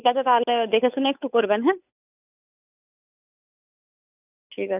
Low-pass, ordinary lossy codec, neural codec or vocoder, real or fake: 3.6 kHz; none; codec, 16 kHz, 8 kbps, FunCodec, trained on Chinese and English, 25 frames a second; fake